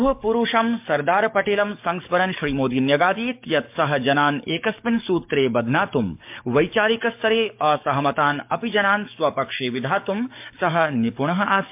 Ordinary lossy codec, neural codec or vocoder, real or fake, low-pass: MP3, 32 kbps; codec, 44.1 kHz, 7.8 kbps, DAC; fake; 3.6 kHz